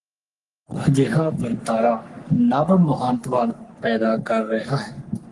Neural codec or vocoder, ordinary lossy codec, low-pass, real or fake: codec, 44.1 kHz, 3.4 kbps, Pupu-Codec; Opus, 24 kbps; 10.8 kHz; fake